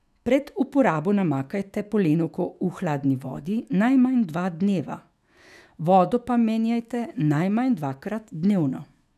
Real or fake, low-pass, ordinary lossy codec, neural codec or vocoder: fake; 14.4 kHz; none; autoencoder, 48 kHz, 128 numbers a frame, DAC-VAE, trained on Japanese speech